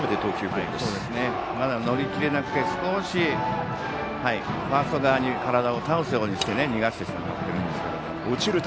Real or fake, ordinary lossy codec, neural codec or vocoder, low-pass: real; none; none; none